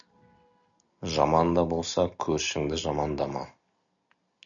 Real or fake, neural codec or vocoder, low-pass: real; none; 7.2 kHz